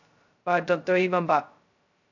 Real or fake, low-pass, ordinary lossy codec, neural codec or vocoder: fake; 7.2 kHz; AAC, 48 kbps; codec, 16 kHz, 0.2 kbps, FocalCodec